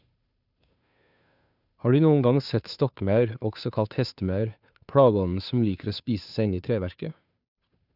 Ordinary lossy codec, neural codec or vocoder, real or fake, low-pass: none; codec, 16 kHz, 2 kbps, FunCodec, trained on Chinese and English, 25 frames a second; fake; 5.4 kHz